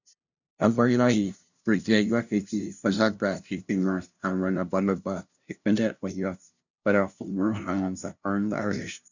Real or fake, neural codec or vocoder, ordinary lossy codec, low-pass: fake; codec, 16 kHz, 0.5 kbps, FunCodec, trained on LibriTTS, 25 frames a second; none; 7.2 kHz